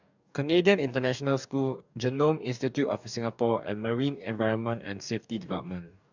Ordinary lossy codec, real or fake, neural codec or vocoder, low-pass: none; fake; codec, 44.1 kHz, 2.6 kbps, DAC; 7.2 kHz